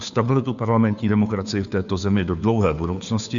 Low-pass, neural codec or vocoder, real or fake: 7.2 kHz; codec, 16 kHz, 2 kbps, FunCodec, trained on Chinese and English, 25 frames a second; fake